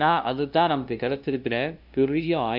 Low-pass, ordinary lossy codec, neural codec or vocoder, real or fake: 5.4 kHz; AAC, 48 kbps; codec, 16 kHz, 0.5 kbps, FunCodec, trained on LibriTTS, 25 frames a second; fake